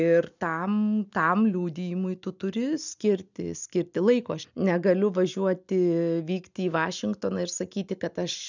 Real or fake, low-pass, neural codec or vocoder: real; 7.2 kHz; none